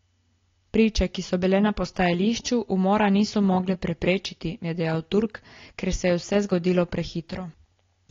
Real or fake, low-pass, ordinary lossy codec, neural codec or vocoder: real; 7.2 kHz; AAC, 32 kbps; none